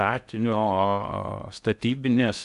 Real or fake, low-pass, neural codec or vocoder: fake; 10.8 kHz; codec, 16 kHz in and 24 kHz out, 0.8 kbps, FocalCodec, streaming, 65536 codes